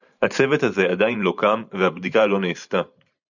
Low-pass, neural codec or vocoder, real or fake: 7.2 kHz; vocoder, 44.1 kHz, 128 mel bands every 512 samples, BigVGAN v2; fake